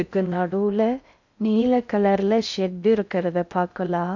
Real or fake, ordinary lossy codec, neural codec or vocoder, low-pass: fake; none; codec, 16 kHz in and 24 kHz out, 0.6 kbps, FocalCodec, streaming, 2048 codes; 7.2 kHz